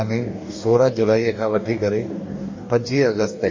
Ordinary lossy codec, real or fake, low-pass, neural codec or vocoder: MP3, 32 kbps; fake; 7.2 kHz; codec, 44.1 kHz, 2.6 kbps, DAC